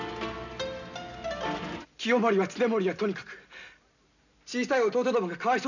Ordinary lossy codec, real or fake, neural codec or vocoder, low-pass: none; real; none; 7.2 kHz